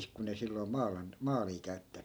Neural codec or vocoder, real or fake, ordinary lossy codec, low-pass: none; real; none; none